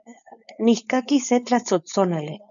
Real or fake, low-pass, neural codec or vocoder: fake; 7.2 kHz; codec, 16 kHz, 4.8 kbps, FACodec